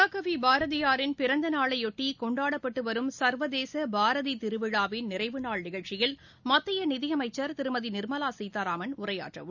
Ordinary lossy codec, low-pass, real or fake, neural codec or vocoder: none; 7.2 kHz; real; none